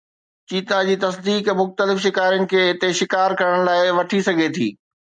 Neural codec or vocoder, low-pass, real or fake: none; 9.9 kHz; real